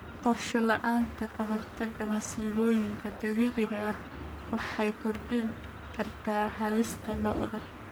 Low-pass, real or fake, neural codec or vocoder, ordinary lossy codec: none; fake; codec, 44.1 kHz, 1.7 kbps, Pupu-Codec; none